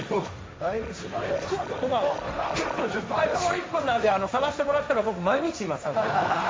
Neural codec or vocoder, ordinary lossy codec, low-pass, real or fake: codec, 16 kHz, 1.1 kbps, Voila-Tokenizer; none; none; fake